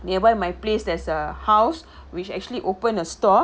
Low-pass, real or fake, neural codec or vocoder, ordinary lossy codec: none; real; none; none